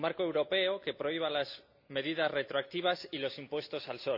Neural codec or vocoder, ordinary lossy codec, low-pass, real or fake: none; AAC, 48 kbps; 5.4 kHz; real